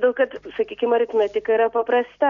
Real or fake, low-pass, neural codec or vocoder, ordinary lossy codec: real; 7.2 kHz; none; MP3, 96 kbps